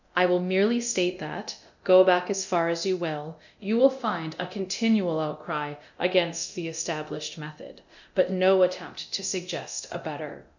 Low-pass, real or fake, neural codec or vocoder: 7.2 kHz; fake; codec, 24 kHz, 0.9 kbps, DualCodec